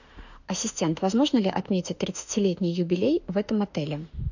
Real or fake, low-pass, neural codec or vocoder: fake; 7.2 kHz; autoencoder, 48 kHz, 32 numbers a frame, DAC-VAE, trained on Japanese speech